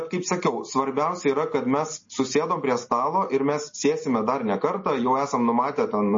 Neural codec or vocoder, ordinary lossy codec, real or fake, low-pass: none; MP3, 32 kbps; real; 7.2 kHz